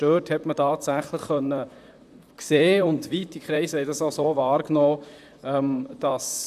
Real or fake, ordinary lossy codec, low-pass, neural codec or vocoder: fake; none; 14.4 kHz; vocoder, 44.1 kHz, 128 mel bands, Pupu-Vocoder